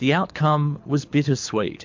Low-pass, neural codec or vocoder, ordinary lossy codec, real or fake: 7.2 kHz; codec, 24 kHz, 6 kbps, HILCodec; MP3, 48 kbps; fake